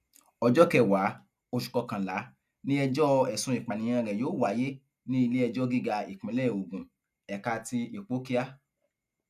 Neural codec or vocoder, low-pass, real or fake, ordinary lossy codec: none; 14.4 kHz; real; none